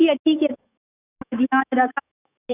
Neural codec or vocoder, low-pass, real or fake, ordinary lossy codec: autoencoder, 48 kHz, 128 numbers a frame, DAC-VAE, trained on Japanese speech; 3.6 kHz; fake; none